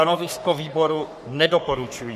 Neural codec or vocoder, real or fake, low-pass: codec, 44.1 kHz, 3.4 kbps, Pupu-Codec; fake; 14.4 kHz